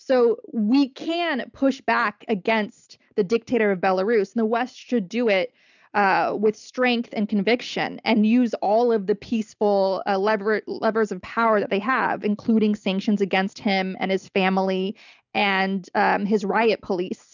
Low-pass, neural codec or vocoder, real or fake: 7.2 kHz; none; real